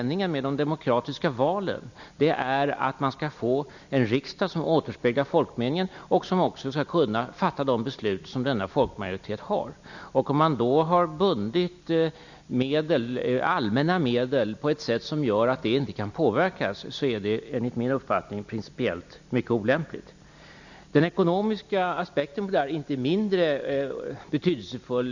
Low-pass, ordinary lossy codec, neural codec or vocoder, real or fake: 7.2 kHz; none; none; real